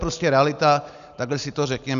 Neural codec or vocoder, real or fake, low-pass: none; real; 7.2 kHz